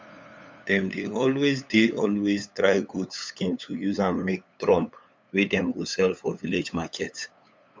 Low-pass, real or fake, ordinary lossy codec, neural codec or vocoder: none; fake; none; codec, 16 kHz, 8 kbps, FunCodec, trained on LibriTTS, 25 frames a second